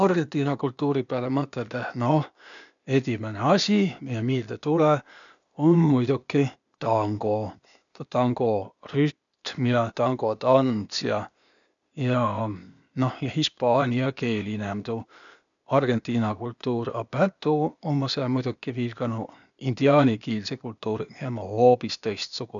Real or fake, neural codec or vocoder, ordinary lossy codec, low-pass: fake; codec, 16 kHz, 0.8 kbps, ZipCodec; none; 7.2 kHz